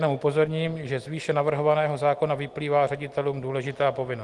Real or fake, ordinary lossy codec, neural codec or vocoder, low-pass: real; Opus, 24 kbps; none; 10.8 kHz